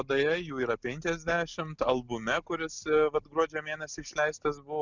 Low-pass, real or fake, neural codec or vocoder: 7.2 kHz; real; none